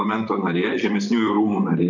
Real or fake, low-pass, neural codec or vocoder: fake; 7.2 kHz; vocoder, 44.1 kHz, 128 mel bands, Pupu-Vocoder